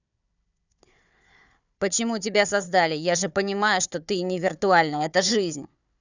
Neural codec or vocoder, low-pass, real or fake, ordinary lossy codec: codec, 16 kHz, 4 kbps, FunCodec, trained on Chinese and English, 50 frames a second; 7.2 kHz; fake; none